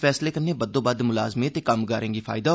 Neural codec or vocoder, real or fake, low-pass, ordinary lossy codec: none; real; none; none